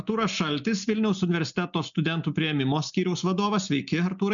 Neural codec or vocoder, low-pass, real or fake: none; 7.2 kHz; real